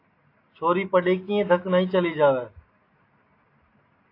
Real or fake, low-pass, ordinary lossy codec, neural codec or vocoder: real; 5.4 kHz; AAC, 32 kbps; none